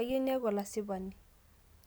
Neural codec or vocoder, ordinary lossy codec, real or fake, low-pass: none; none; real; none